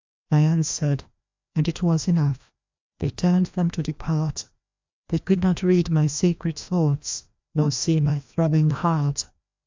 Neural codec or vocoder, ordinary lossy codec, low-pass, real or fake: codec, 16 kHz, 1 kbps, FreqCodec, larger model; MP3, 64 kbps; 7.2 kHz; fake